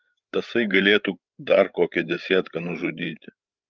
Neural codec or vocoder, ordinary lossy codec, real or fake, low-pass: codec, 16 kHz, 8 kbps, FreqCodec, larger model; Opus, 24 kbps; fake; 7.2 kHz